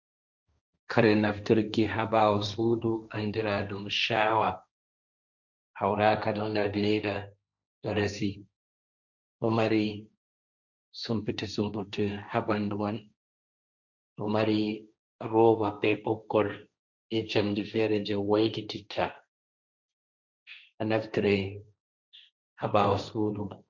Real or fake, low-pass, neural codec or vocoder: fake; 7.2 kHz; codec, 16 kHz, 1.1 kbps, Voila-Tokenizer